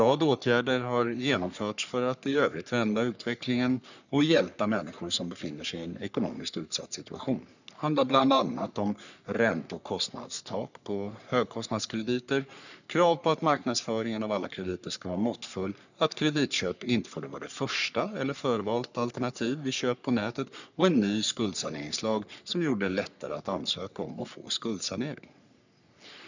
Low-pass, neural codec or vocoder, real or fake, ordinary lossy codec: 7.2 kHz; codec, 44.1 kHz, 3.4 kbps, Pupu-Codec; fake; none